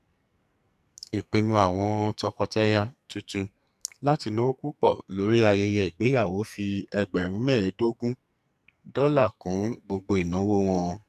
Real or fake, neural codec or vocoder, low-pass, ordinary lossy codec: fake; codec, 44.1 kHz, 2.6 kbps, SNAC; 14.4 kHz; none